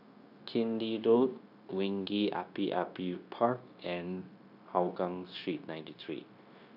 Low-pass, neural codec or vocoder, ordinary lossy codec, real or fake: 5.4 kHz; codec, 16 kHz, 0.9 kbps, LongCat-Audio-Codec; none; fake